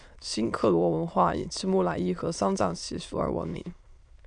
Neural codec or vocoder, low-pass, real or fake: autoencoder, 22.05 kHz, a latent of 192 numbers a frame, VITS, trained on many speakers; 9.9 kHz; fake